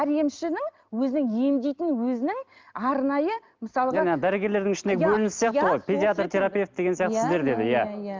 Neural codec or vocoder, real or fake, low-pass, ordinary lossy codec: none; real; 7.2 kHz; Opus, 24 kbps